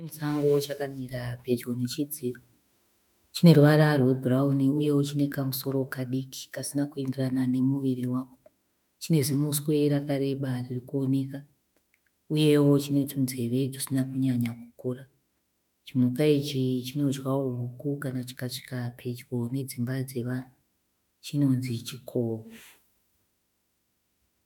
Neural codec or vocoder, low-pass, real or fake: autoencoder, 48 kHz, 32 numbers a frame, DAC-VAE, trained on Japanese speech; 19.8 kHz; fake